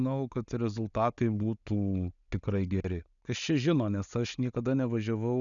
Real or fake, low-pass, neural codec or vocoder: real; 7.2 kHz; none